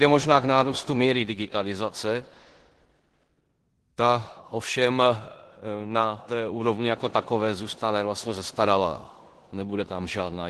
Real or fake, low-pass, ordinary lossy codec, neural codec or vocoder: fake; 10.8 kHz; Opus, 16 kbps; codec, 16 kHz in and 24 kHz out, 0.9 kbps, LongCat-Audio-Codec, four codebook decoder